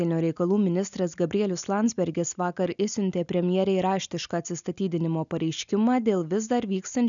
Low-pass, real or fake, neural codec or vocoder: 7.2 kHz; real; none